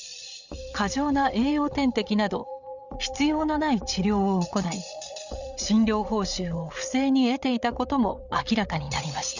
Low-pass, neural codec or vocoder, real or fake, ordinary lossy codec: 7.2 kHz; codec, 16 kHz, 8 kbps, FreqCodec, larger model; fake; none